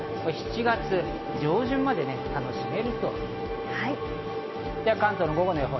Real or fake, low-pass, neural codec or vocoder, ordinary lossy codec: real; 7.2 kHz; none; MP3, 24 kbps